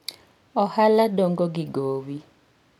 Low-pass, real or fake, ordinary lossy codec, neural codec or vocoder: 19.8 kHz; real; none; none